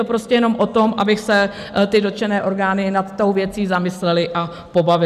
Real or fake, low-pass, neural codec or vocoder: real; 14.4 kHz; none